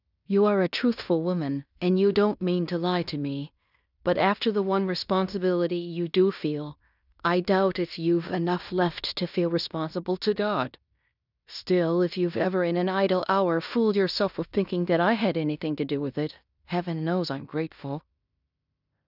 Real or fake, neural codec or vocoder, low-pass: fake; codec, 16 kHz in and 24 kHz out, 0.9 kbps, LongCat-Audio-Codec, fine tuned four codebook decoder; 5.4 kHz